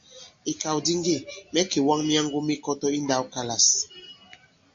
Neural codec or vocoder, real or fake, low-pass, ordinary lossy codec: none; real; 7.2 kHz; MP3, 48 kbps